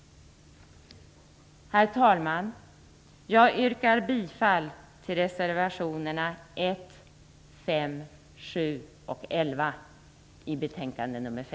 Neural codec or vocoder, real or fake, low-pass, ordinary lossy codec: none; real; none; none